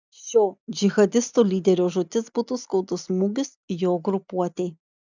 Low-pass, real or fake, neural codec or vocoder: 7.2 kHz; real; none